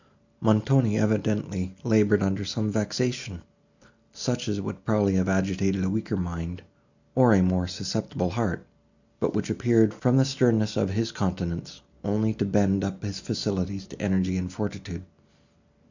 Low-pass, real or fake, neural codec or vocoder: 7.2 kHz; real; none